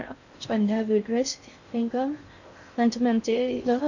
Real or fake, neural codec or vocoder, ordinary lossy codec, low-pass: fake; codec, 16 kHz in and 24 kHz out, 0.6 kbps, FocalCodec, streaming, 2048 codes; none; 7.2 kHz